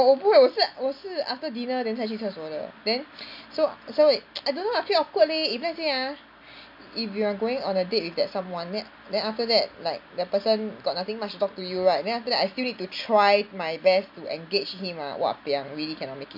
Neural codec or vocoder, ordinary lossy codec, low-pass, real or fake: none; MP3, 48 kbps; 5.4 kHz; real